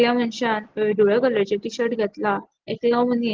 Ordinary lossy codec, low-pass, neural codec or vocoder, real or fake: Opus, 16 kbps; 7.2 kHz; none; real